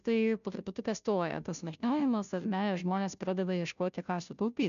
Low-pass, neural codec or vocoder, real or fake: 7.2 kHz; codec, 16 kHz, 0.5 kbps, FunCodec, trained on Chinese and English, 25 frames a second; fake